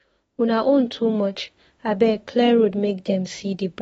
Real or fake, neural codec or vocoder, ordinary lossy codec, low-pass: fake; autoencoder, 48 kHz, 32 numbers a frame, DAC-VAE, trained on Japanese speech; AAC, 24 kbps; 19.8 kHz